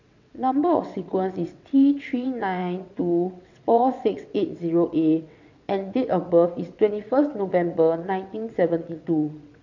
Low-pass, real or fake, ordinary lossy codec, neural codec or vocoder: 7.2 kHz; fake; none; vocoder, 22.05 kHz, 80 mel bands, WaveNeXt